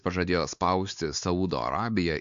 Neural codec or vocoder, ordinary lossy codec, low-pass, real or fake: codec, 16 kHz, 4 kbps, X-Codec, WavLM features, trained on Multilingual LibriSpeech; MP3, 64 kbps; 7.2 kHz; fake